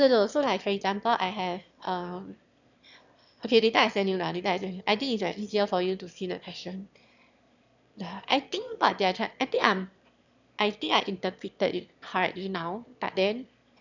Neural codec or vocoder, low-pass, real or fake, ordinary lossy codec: autoencoder, 22.05 kHz, a latent of 192 numbers a frame, VITS, trained on one speaker; 7.2 kHz; fake; none